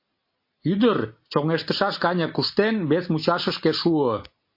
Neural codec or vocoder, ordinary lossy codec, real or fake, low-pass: none; MP3, 32 kbps; real; 5.4 kHz